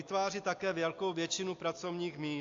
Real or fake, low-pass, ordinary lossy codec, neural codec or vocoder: real; 7.2 kHz; AAC, 48 kbps; none